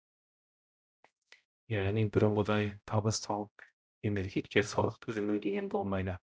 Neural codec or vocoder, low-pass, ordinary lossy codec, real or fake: codec, 16 kHz, 0.5 kbps, X-Codec, HuBERT features, trained on balanced general audio; none; none; fake